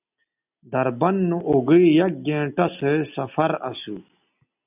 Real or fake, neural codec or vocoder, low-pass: real; none; 3.6 kHz